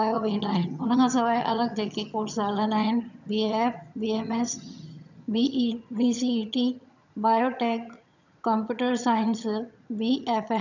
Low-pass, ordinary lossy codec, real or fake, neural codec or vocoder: 7.2 kHz; none; fake; vocoder, 22.05 kHz, 80 mel bands, HiFi-GAN